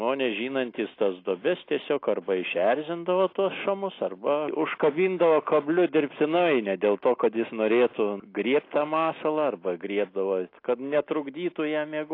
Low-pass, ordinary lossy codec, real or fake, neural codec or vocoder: 5.4 kHz; AAC, 32 kbps; real; none